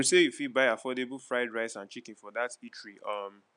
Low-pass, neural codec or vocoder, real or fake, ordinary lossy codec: 9.9 kHz; none; real; MP3, 96 kbps